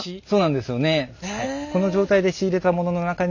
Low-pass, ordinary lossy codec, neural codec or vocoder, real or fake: 7.2 kHz; none; none; real